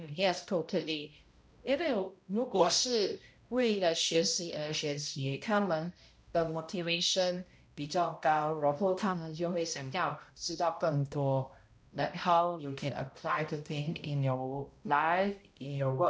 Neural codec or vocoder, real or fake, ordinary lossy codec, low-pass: codec, 16 kHz, 0.5 kbps, X-Codec, HuBERT features, trained on balanced general audio; fake; none; none